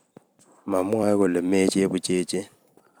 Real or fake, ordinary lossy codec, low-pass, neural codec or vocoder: fake; none; none; vocoder, 44.1 kHz, 128 mel bands every 256 samples, BigVGAN v2